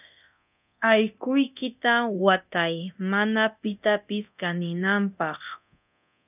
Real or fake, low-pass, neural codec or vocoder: fake; 3.6 kHz; codec, 24 kHz, 0.9 kbps, DualCodec